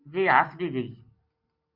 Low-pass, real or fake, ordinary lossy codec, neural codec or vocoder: 5.4 kHz; real; Opus, 64 kbps; none